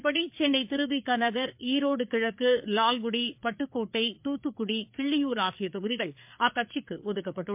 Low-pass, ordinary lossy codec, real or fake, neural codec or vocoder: 3.6 kHz; MP3, 32 kbps; fake; codec, 16 kHz, 4 kbps, FreqCodec, larger model